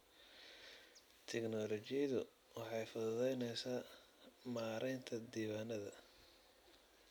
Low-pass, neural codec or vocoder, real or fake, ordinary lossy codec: none; none; real; none